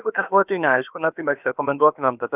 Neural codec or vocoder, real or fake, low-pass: codec, 16 kHz, about 1 kbps, DyCAST, with the encoder's durations; fake; 3.6 kHz